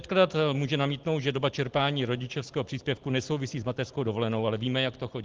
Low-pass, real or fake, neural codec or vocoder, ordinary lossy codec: 7.2 kHz; real; none; Opus, 16 kbps